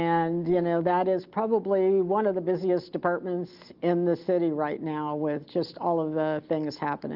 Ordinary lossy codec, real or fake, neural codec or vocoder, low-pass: Opus, 24 kbps; real; none; 5.4 kHz